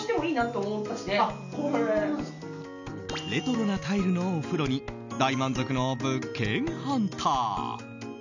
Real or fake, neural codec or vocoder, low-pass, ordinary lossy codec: real; none; 7.2 kHz; none